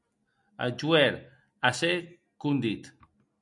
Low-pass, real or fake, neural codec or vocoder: 10.8 kHz; real; none